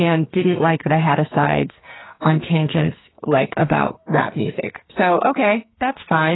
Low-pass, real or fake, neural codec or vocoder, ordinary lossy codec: 7.2 kHz; fake; codec, 44.1 kHz, 2.6 kbps, SNAC; AAC, 16 kbps